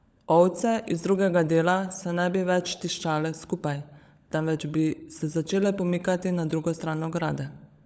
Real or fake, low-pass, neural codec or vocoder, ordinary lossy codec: fake; none; codec, 16 kHz, 16 kbps, FunCodec, trained on LibriTTS, 50 frames a second; none